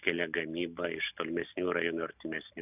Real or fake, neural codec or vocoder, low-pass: real; none; 3.6 kHz